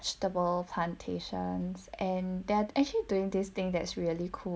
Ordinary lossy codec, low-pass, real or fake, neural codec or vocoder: none; none; real; none